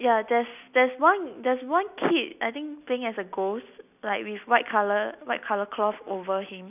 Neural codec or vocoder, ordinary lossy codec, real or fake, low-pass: none; none; real; 3.6 kHz